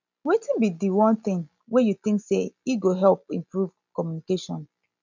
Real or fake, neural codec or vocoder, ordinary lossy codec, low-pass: real; none; none; 7.2 kHz